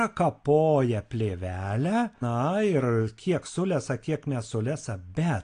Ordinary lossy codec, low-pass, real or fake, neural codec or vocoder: AAC, 48 kbps; 9.9 kHz; real; none